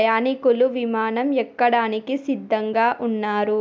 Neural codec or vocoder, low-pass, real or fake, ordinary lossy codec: none; none; real; none